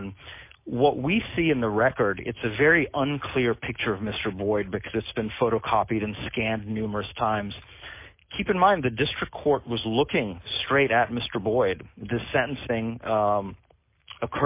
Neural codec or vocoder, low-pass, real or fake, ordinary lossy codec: none; 3.6 kHz; real; MP3, 24 kbps